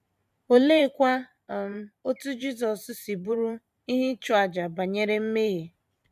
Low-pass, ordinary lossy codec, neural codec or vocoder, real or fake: 14.4 kHz; none; vocoder, 44.1 kHz, 128 mel bands every 512 samples, BigVGAN v2; fake